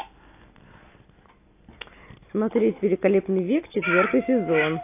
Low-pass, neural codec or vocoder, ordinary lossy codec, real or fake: 3.6 kHz; none; AAC, 24 kbps; real